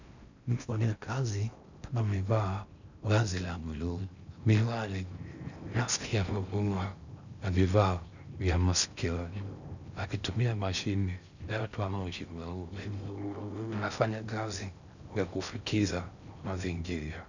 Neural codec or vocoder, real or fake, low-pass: codec, 16 kHz in and 24 kHz out, 0.6 kbps, FocalCodec, streaming, 4096 codes; fake; 7.2 kHz